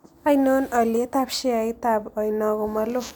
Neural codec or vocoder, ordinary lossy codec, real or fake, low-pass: none; none; real; none